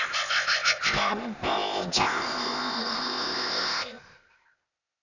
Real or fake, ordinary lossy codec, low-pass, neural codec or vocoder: fake; none; 7.2 kHz; codec, 16 kHz, 0.8 kbps, ZipCodec